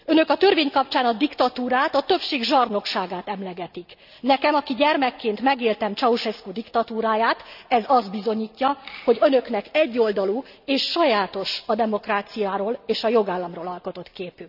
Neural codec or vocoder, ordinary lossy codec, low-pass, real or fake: none; none; 5.4 kHz; real